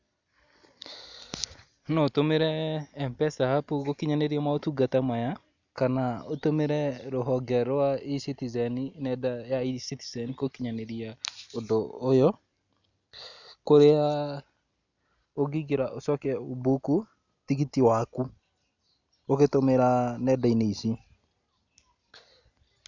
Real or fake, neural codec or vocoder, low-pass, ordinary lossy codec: real; none; 7.2 kHz; none